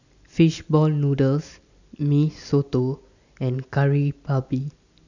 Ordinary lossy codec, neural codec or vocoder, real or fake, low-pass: none; none; real; 7.2 kHz